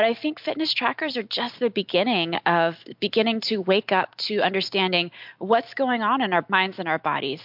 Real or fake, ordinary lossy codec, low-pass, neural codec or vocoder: real; MP3, 48 kbps; 5.4 kHz; none